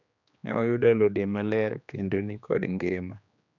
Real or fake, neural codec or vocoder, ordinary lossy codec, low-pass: fake; codec, 16 kHz, 2 kbps, X-Codec, HuBERT features, trained on general audio; none; 7.2 kHz